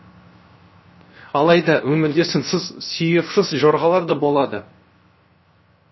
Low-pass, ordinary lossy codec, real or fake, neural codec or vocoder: 7.2 kHz; MP3, 24 kbps; fake; codec, 16 kHz, 0.7 kbps, FocalCodec